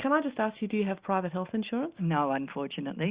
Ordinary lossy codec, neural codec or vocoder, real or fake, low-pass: Opus, 64 kbps; none; real; 3.6 kHz